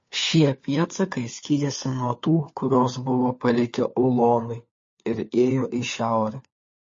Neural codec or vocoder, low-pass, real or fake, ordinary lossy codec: codec, 16 kHz, 4 kbps, FunCodec, trained on LibriTTS, 50 frames a second; 7.2 kHz; fake; MP3, 32 kbps